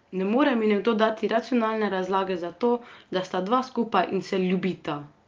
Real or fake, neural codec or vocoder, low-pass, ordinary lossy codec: real; none; 7.2 kHz; Opus, 24 kbps